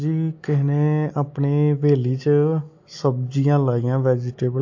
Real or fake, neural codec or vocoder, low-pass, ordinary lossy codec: real; none; 7.2 kHz; AAC, 48 kbps